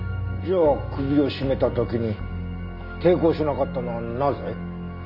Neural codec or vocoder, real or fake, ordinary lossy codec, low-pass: none; real; none; 5.4 kHz